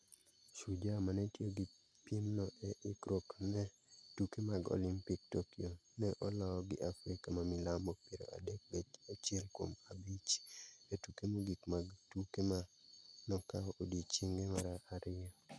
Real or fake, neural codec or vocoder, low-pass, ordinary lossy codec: real; none; none; none